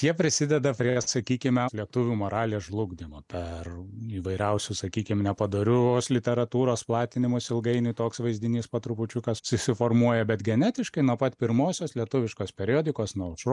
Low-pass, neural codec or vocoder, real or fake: 10.8 kHz; none; real